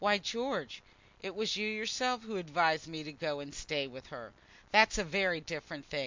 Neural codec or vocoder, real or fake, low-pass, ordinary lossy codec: none; real; 7.2 kHz; MP3, 64 kbps